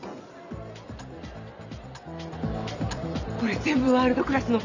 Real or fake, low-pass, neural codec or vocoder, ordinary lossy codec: real; 7.2 kHz; none; none